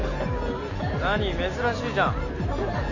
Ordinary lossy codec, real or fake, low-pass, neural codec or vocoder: none; real; 7.2 kHz; none